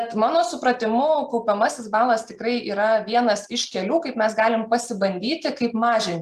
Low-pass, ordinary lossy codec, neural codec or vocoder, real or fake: 14.4 kHz; Opus, 32 kbps; none; real